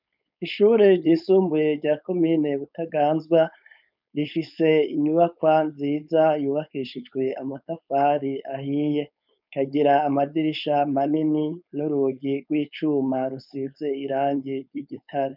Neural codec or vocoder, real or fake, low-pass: codec, 16 kHz, 4.8 kbps, FACodec; fake; 5.4 kHz